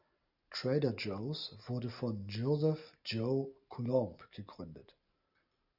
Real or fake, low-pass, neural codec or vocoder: real; 5.4 kHz; none